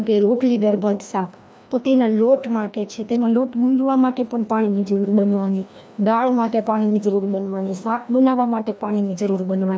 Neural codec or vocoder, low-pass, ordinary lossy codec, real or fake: codec, 16 kHz, 1 kbps, FreqCodec, larger model; none; none; fake